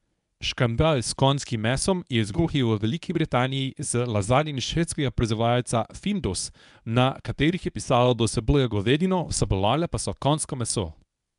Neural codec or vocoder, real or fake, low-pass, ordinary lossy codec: codec, 24 kHz, 0.9 kbps, WavTokenizer, medium speech release version 1; fake; 10.8 kHz; none